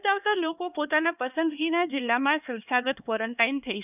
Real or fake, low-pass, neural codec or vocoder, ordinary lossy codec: fake; 3.6 kHz; codec, 16 kHz, 2 kbps, X-Codec, HuBERT features, trained on LibriSpeech; none